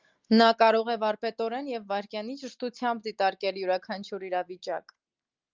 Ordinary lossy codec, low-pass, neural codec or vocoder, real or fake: Opus, 24 kbps; 7.2 kHz; none; real